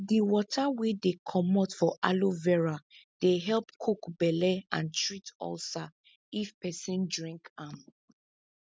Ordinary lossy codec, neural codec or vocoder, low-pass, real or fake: none; none; none; real